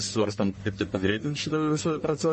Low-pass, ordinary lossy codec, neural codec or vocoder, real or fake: 10.8 kHz; MP3, 32 kbps; codec, 44.1 kHz, 1.7 kbps, Pupu-Codec; fake